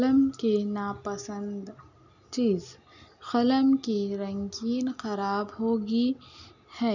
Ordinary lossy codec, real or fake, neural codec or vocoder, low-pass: none; real; none; 7.2 kHz